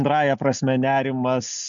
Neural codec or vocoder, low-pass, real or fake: none; 7.2 kHz; real